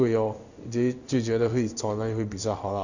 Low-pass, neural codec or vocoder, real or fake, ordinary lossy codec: 7.2 kHz; codec, 16 kHz in and 24 kHz out, 1 kbps, XY-Tokenizer; fake; none